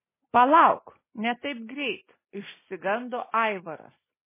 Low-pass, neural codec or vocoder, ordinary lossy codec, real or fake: 3.6 kHz; none; MP3, 16 kbps; real